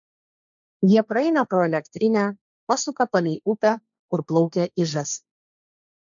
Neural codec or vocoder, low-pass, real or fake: codec, 16 kHz, 1.1 kbps, Voila-Tokenizer; 7.2 kHz; fake